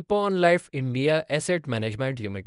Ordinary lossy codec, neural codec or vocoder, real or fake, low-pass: none; codec, 24 kHz, 0.9 kbps, WavTokenizer, medium speech release version 1; fake; 10.8 kHz